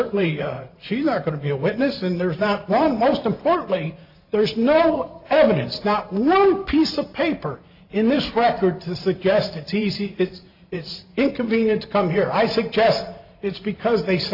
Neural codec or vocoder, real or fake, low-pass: vocoder, 44.1 kHz, 128 mel bands every 512 samples, BigVGAN v2; fake; 5.4 kHz